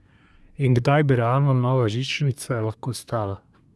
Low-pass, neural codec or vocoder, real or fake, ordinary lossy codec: none; codec, 24 kHz, 1 kbps, SNAC; fake; none